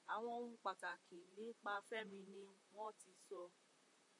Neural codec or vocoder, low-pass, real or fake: vocoder, 44.1 kHz, 128 mel bands, Pupu-Vocoder; 10.8 kHz; fake